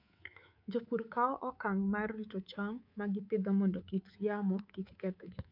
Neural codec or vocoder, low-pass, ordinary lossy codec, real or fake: codec, 24 kHz, 3.1 kbps, DualCodec; 5.4 kHz; none; fake